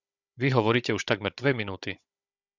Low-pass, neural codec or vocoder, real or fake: 7.2 kHz; codec, 16 kHz, 16 kbps, FunCodec, trained on Chinese and English, 50 frames a second; fake